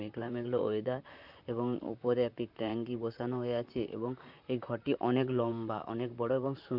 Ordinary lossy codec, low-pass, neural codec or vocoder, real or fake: none; 5.4 kHz; vocoder, 44.1 kHz, 128 mel bands, Pupu-Vocoder; fake